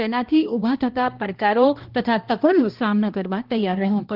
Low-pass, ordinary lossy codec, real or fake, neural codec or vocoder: 5.4 kHz; Opus, 16 kbps; fake; codec, 16 kHz, 1 kbps, X-Codec, HuBERT features, trained on balanced general audio